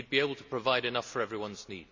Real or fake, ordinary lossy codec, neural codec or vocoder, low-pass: real; MP3, 64 kbps; none; 7.2 kHz